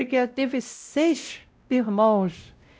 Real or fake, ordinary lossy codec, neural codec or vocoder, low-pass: fake; none; codec, 16 kHz, 0.5 kbps, X-Codec, WavLM features, trained on Multilingual LibriSpeech; none